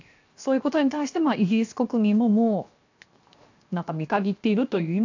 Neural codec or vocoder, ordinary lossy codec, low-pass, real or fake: codec, 16 kHz, 0.7 kbps, FocalCodec; AAC, 48 kbps; 7.2 kHz; fake